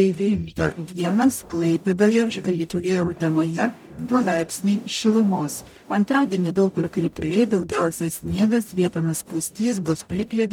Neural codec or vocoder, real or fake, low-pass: codec, 44.1 kHz, 0.9 kbps, DAC; fake; 19.8 kHz